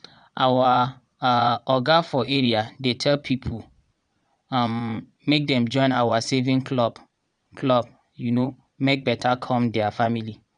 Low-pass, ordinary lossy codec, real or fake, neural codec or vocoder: 9.9 kHz; none; fake; vocoder, 22.05 kHz, 80 mel bands, Vocos